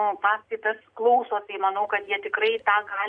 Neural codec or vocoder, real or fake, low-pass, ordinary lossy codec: none; real; 9.9 kHz; MP3, 96 kbps